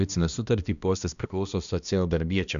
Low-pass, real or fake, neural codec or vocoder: 7.2 kHz; fake; codec, 16 kHz, 1 kbps, X-Codec, HuBERT features, trained on balanced general audio